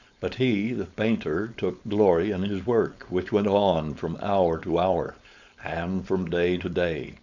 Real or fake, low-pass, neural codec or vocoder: fake; 7.2 kHz; codec, 16 kHz, 4.8 kbps, FACodec